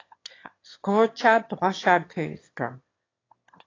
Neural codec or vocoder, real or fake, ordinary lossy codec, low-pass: autoencoder, 22.05 kHz, a latent of 192 numbers a frame, VITS, trained on one speaker; fake; AAC, 32 kbps; 7.2 kHz